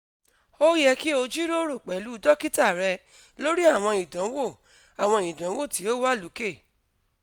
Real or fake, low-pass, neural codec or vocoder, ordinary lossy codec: real; none; none; none